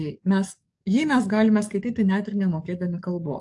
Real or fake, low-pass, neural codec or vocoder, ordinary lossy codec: fake; 10.8 kHz; codec, 44.1 kHz, 7.8 kbps, DAC; AAC, 64 kbps